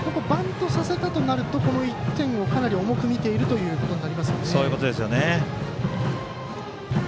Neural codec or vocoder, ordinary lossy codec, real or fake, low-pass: none; none; real; none